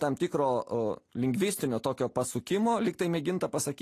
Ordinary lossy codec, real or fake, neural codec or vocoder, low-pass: AAC, 48 kbps; real; none; 14.4 kHz